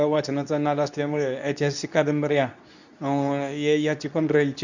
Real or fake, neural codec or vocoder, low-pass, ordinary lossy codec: fake; codec, 24 kHz, 0.9 kbps, WavTokenizer, medium speech release version 2; 7.2 kHz; none